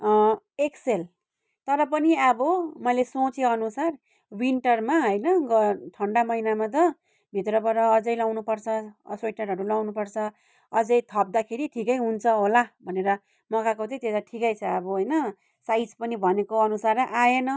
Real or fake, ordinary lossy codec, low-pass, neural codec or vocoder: real; none; none; none